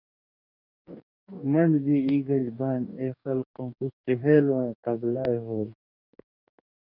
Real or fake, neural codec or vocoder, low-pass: fake; codec, 44.1 kHz, 2.6 kbps, DAC; 5.4 kHz